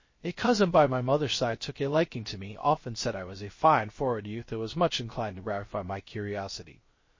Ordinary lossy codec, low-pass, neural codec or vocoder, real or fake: MP3, 32 kbps; 7.2 kHz; codec, 16 kHz, 0.3 kbps, FocalCodec; fake